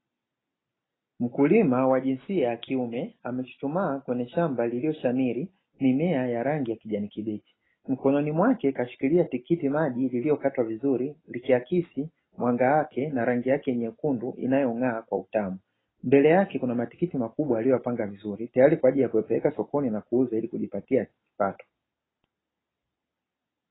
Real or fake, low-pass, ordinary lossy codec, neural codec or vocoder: real; 7.2 kHz; AAC, 16 kbps; none